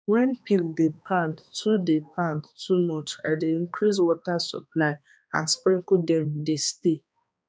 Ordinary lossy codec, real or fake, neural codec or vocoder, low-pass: none; fake; codec, 16 kHz, 2 kbps, X-Codec, HuBERT features, trained on balanced general audio; none